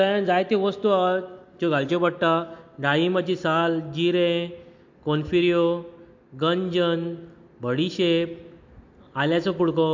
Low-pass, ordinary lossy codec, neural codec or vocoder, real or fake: 7.2 kHz; MP3, 48 kbps; none; real